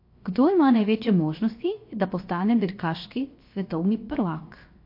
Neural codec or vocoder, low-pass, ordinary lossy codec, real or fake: codec, 16 kHz, 0.7 kbps, FocalCodec; 5.4 kHz; MP3, 32 kbps; fake